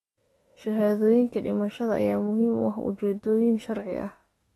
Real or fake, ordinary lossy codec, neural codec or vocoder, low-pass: fake; AAC, 32 kbps; autoencoder, 48 kHz, 32 numbers a frame, DAC-VAE, trained on Japanese speech; 19.8 kHz